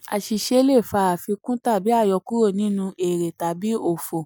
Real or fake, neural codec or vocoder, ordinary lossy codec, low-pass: real; none; none; none